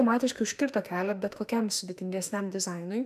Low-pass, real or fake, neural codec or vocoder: 14.4 kHz; fake; autoencoder, 48 kHz, 32 numbers a frame, DAC-VAE, trained on Japanese speech